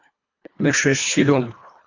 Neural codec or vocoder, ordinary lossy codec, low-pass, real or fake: codec, 24 kHz, 1.5 kbps, HILCodec; AAC, 48 kbps; 7.2 kHz; fake